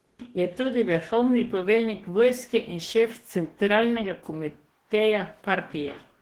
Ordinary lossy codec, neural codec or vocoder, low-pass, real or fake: Opus, 16 kbps; codec, 44.1 kHz, 2.6 kbps, DAC; 19.8 kHz; fake